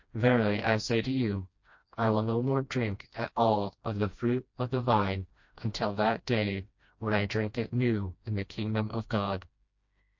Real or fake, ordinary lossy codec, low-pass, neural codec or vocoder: fake; MP3, 48 kbps; 7.2 kHz; codec, 16 kHz, 1 kbps, FreqCodec, smaller model